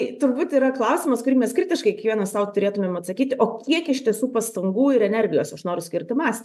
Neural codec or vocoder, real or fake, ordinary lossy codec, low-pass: none; real; MP3, 96 kbps; 14.4 kHz